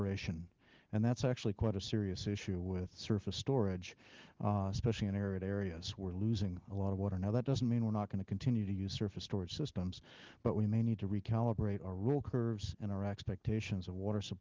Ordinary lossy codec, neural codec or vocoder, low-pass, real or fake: Opus, 16 kbps; none; 7.2 kHz; real